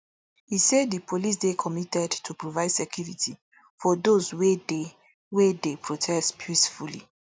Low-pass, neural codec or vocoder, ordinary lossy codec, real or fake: none; none; none; real